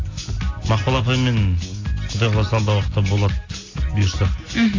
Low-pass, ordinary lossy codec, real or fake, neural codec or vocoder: 7.2 kHz; AAC, 32 kbps; real; none